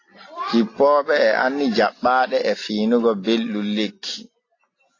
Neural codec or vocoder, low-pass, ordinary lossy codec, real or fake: none; 7.2 kHz; AAC, 32 kbps; real